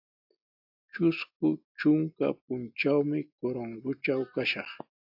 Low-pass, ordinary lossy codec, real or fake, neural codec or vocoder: 5.4 kHz; AAC, 48 kbps; real; none